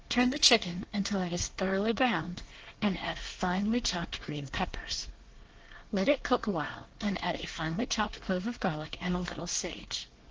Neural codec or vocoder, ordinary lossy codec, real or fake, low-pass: codec, 24 kHz, 1 kbps, SNAC; Opus, 16 kbps; fake; 7.2 kHz